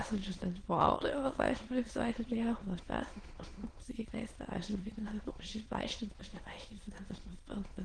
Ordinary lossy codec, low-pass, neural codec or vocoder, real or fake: Opus, 16 kbps; 9.9 kHz; autoencoder, 22.05 kHz, a latent of 192 numbers a frame, VITS, trained on many speakers; fake